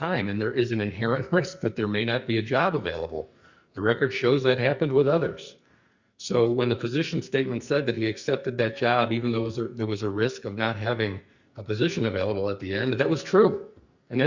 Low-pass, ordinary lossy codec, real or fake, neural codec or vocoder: 7.2 kHz; Opus, 64 kbps; fake; codec, 44.1 kHz, 2.6 kbps, SNAC